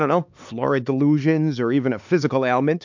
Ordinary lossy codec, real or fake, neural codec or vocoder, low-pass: MP3, 64 kbps; fake; codec, 16 kHz, 4 kbps, X-Codec, HuBERT features, trained on LibriSpeech; 7.2 kHz